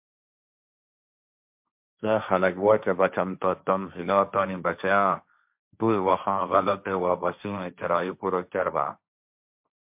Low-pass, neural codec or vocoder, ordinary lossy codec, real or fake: 3.6 kHz; codec, 16 kHz, 1.1 kbps, Voila-Tokenizer; MP3, 32 kbps; fake